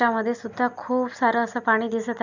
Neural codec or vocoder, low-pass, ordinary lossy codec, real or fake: none; 7.2 kHz; none; real